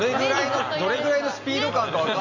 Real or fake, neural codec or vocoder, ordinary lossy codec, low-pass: real; none; none; 7.2 kHz